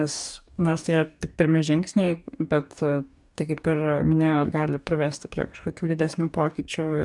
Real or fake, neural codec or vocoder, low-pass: fake; codec, 44.1 kHz, 2.6 kbps, DAC; 10.8 kHz